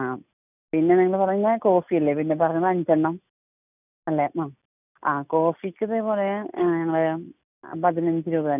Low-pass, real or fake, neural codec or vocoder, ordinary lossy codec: 3.6 kHz; real; none; none